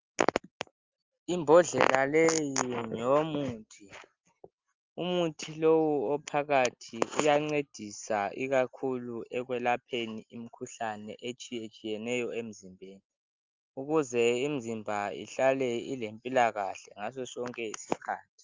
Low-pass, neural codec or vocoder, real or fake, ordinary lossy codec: 7.2 kHz; none; real; Opus, 32 kbps